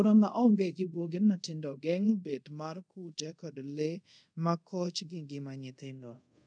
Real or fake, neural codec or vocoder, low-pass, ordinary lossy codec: fake; codec, 24 kHz, 0.5 kbps, DualCodec; 9.9 kHz; none